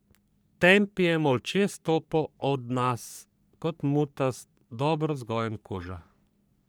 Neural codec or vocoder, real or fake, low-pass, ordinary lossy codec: codec, 44.1 kHz, 3.4 kbps, Pupu-Codec; fake; none; none